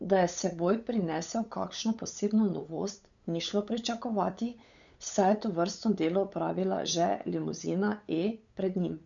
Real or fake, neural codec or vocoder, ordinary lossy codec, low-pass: fake; codec, 16 kHz, 8 kbps, FunCodec, trained on LibriTTS, 25 frames a second; none; 7.2 kHz